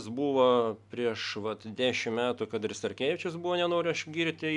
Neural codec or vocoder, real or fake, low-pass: none; real; 10.8 kHz